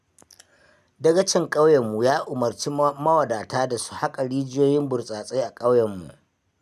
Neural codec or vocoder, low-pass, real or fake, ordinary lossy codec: none; 14.4 kHz; real; none